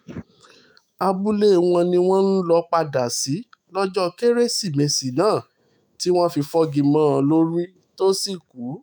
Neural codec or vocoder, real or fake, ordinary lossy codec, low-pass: autoencoder, 48 kHz, 128 numbers a frame, DAC-VAE, trained on Japanese speech; fake; none; none